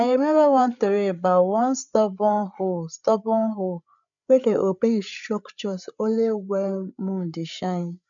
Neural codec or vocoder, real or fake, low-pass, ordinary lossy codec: codec, 16 kHz, 8 kbps, FreqCodec, larger model; fake; 7.2 kHz; none